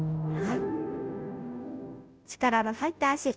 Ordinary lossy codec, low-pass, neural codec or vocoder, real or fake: none; none; codec, 16 kHz, 0.5 kbps, FunCodec, trained on Chinese and English, 25 frames a second; fake